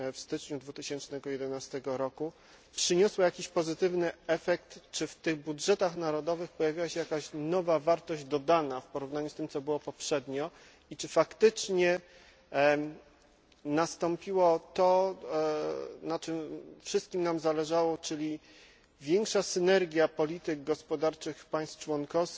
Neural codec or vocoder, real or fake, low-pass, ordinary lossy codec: none; real; none; none